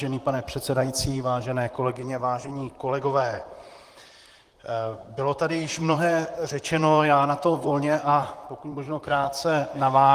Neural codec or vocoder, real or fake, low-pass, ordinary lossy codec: vocoder, 44.1 kHz, 128 mel bands, Pupu-Vocoder; fake; 14.4 kHz; Opus, 24 kbps